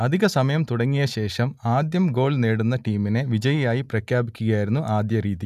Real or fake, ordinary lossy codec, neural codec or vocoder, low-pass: real; none; none; 14.4 kHz